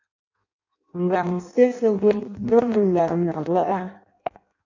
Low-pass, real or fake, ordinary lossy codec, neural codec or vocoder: 7.2 kHz; fake; AAC, 48 kbps; codec, 16 kHz in and 24 kHz out, 0.6 kbps, FireRedTTS-2 codec